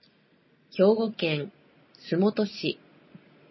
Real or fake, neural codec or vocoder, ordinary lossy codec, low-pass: real; none; MP3, 24 kbps; 7.2 kHz